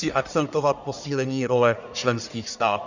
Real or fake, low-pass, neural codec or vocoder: fake; 7.2 kHz; codec, 44.1 kHz, 1.7 kbps, Pupu-Codec